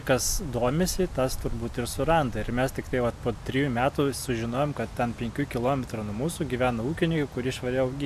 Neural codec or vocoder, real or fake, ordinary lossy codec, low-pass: none; real; MP3, 96 kbps; 14.4 kHz